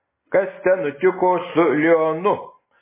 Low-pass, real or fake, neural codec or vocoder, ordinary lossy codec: 3.6 kHz; real; none; MP3, 16 kbps